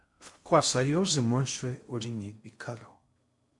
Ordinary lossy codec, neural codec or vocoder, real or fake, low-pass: MP3, 64 kbps; codec, 16 kHz in and 24 kHz out, 0.6 kbps, FocalCodec, streaming, 2048 codes; fake; 10.8 kHz